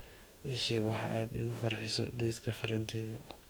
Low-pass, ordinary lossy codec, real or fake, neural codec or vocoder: none; none; fake; codec, 44.1 kHz, 2.6 kbps, DAC